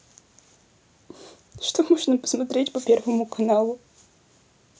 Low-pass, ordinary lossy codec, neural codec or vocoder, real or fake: none; none; none; real